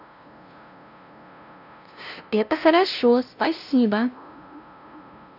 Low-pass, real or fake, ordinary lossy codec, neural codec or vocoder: 5.4 kHz; fake; none; codec, 16 kHz, 0.5 kbps, FunCodec, trained on LibriTTS, 25 frames a second